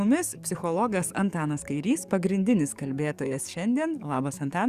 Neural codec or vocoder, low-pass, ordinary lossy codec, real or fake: codec, 44.1 kHz, 7.8 kbps, DAC; 14.4 kHz; Opus, 64 kbps; fake